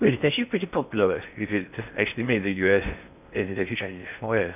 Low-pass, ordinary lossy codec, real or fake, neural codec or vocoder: 3.6 kHz; none; fake; codec, 16 kHz in and 24 kHz out, 0.6 kbps, FocalCodec, streaming, 4096 codes